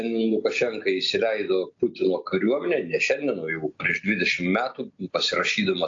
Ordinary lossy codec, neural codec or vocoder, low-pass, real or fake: AAC, 48 kbps; none; 7.2 kHz; real